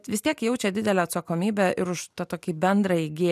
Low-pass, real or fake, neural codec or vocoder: 14.4 kHz; real; none